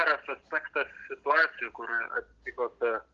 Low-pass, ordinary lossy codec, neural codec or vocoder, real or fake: 7.2 kHz; Opus, 16 kbps; none; real